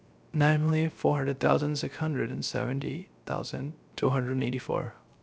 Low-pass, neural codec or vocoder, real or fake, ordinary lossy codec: none; codec, 16 kHz, 0.3 kbps, FocalCodec; fake; none